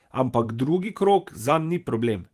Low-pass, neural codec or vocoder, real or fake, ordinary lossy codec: 14.4 kHz; vocoder, 44.1 kHz, 128 mel bands every 512 samples, BigVGAN v2; fake; Opus, 24 kbps